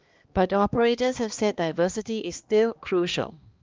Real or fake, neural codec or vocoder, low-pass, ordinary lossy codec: fake; codec, 16 kHz, 2 kbps, X-Codec, HuBERT features, trained on balanced general audio; 7.2 kHz; Opus, 32 kbps